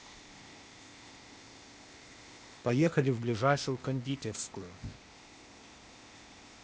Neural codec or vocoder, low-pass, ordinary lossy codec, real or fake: codec, 16 kHz, 0.8 kbps, ZipCodec; none; none; fake